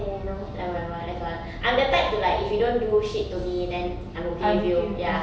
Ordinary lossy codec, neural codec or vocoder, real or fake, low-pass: none; none; real; none